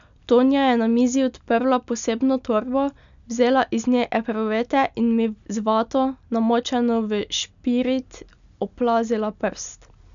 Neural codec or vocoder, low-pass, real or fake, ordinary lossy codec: none; 7.2 kHz; real; none